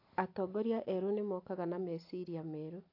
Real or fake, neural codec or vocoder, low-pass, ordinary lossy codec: real; none; 5.4 kHz; AAC, 32 kbps